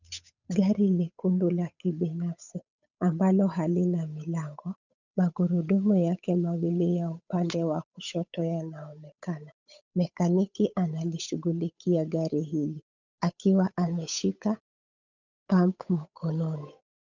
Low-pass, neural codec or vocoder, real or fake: 7.2 kHz; codec, 16 kHz, 8 kbps, FunCodec, trained on Chinese and English, 25 frames a second; fake